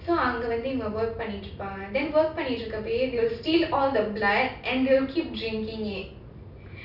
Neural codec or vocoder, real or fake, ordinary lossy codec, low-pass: none; real; none; 5.4 kHz